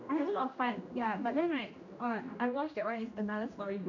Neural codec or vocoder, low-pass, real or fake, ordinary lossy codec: codec, 16 kHz, 1 kbps, X-Codec, HuBERT features, trained on general audio; 7.2 kHz; fake; none